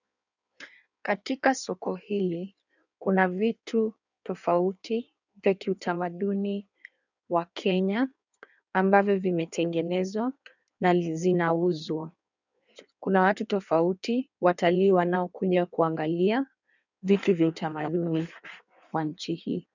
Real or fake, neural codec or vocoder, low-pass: fake; codec, 16 kHz in and 24 kHz out, 1.1 kbps, FireRedTTS-2 codec; 7.2 kHz